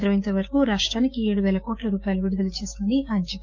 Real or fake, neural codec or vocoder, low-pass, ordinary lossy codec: fake; codec, 16 kHz, 6 kbps, DAC; none; none